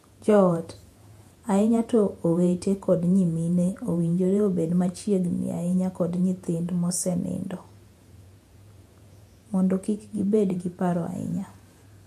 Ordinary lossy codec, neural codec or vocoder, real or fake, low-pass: MP3, 64 kbps; vocoder, 48 kHz, 128 mel bands, Vocos; fake; 14.4 kHz